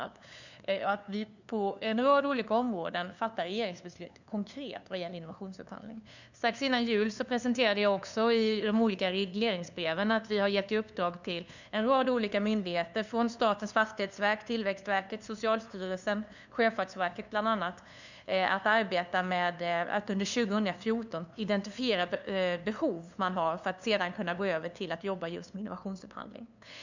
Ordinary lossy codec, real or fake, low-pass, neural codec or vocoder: none; fake; 7.2 kHz; codec, 16 kHz, 2 kbps, FunCodec, trained on LibriTTS, 25 frames a second